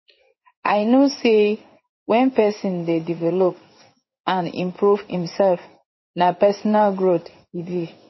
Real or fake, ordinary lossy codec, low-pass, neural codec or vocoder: fake; MP3, 24 kbps; 7.2 kHz; codec, 16 kHz in and 24 kHz out, 1 kbps, XY-Tokenizer